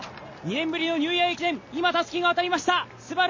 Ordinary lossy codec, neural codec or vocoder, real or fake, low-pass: MP3, 32 kbps; none; real; 7.2 kHz